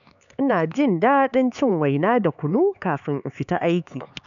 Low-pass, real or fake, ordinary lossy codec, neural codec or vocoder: 7.2 kHz; fake; none; codec, 16 kHz, 4 kbps, X-Codec, HuBERT features, trained on LibriSpeech